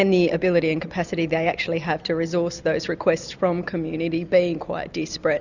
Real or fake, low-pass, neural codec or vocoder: real; 7.2 kHz; none